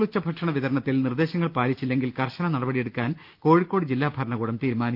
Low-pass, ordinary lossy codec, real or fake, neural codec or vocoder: 5.4 kHz; Opus, 32 kbps; real; none